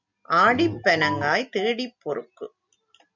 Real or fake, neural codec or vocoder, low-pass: real; none; 7.2 kHz